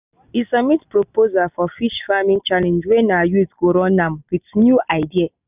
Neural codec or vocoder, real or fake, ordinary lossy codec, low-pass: none; real; none; 3.6 kHz